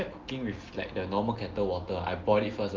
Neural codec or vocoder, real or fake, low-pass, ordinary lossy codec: none; real; 7.2 kHz; Opus, 16 kbps